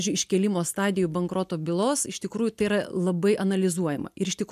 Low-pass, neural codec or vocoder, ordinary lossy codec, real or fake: 14.4 kHz; none; MP3, 96 kbps; real